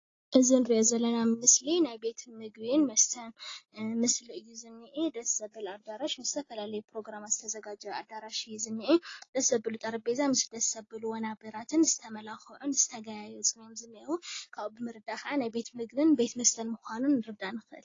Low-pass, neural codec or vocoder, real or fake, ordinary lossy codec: 7.2 kHz; none; real; AAC, 32 kbps